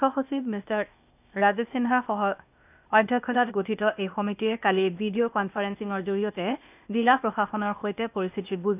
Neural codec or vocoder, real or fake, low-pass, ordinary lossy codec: codec, 16 kHz, 0.8 kbps, ZipCodec; fake; 3.6 kHz; none